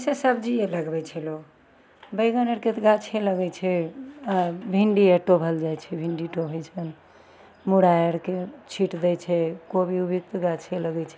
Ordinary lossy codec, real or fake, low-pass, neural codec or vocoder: none; real; none; none